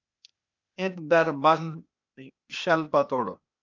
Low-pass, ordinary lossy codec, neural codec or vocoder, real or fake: 7.2 kHz; MP3, 64 kbps; codec, 16 kHz, 0.8 kbps, ZipCodec; fake